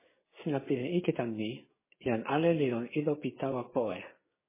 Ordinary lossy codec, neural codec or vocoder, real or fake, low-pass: MP3, 16 kbps; codec, 16 kHz, 6 kbps, DAC; fake; 3.6 kHz